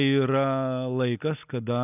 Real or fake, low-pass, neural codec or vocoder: real; 3.6 kHz; none